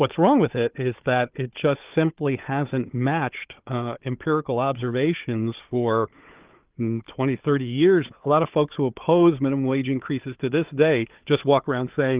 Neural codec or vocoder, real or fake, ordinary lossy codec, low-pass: codec, 16 kHz, 4 kbps, FunCodec, trained on Chinese and English, 50 frames a second; fake; Opus, 32 kbps; 3.6 kHz